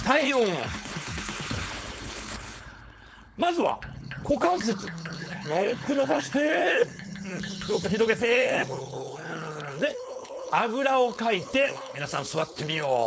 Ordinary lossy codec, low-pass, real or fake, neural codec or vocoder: none; none; fake; codec, 16 kHz, 4.8 kbps, FACodec